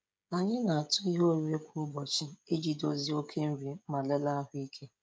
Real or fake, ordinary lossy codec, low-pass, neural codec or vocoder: fake; none; none; codec, 16 kHz, 16 kbps, FreqCodec, smaller model